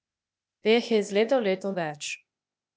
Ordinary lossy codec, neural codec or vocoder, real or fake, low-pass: none; codec, 16 kHz, 0.8 kbps, ZipCodec; fake; none